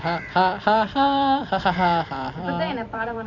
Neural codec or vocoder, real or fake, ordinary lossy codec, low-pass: vocoder, 44.1 kHz, 128 mel bands every 256 samples, BigVGAN v2; fake; none; 7.2 kHz